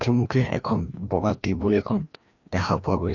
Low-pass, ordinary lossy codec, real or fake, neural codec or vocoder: 7.2 kHz; none; fake; codec, 16 kHz, 1 kbps, FreqCodec, larger model